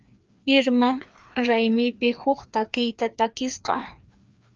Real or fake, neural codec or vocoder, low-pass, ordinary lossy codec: fake; codec, 16 kHz, 2 kbps, FreqCodec, larger model; 7.2 kHz; Opus, 24 kbps